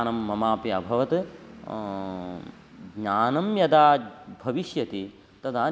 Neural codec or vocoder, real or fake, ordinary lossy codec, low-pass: none; real; none; none